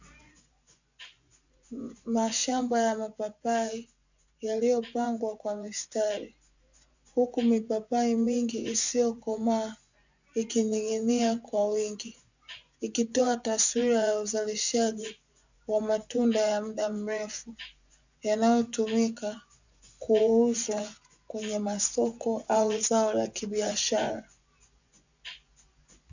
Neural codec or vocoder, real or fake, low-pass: vocoder, 24 kHz, 100 mel bands, Vocos; fake; 7.2 kHz